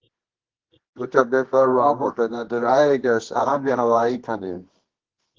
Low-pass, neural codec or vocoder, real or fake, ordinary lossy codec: 7.2 kHz; codec, 24 kHz, 0.9 kbps, WavTokenizer, medium music audio release; fake; Opus, 16 kbps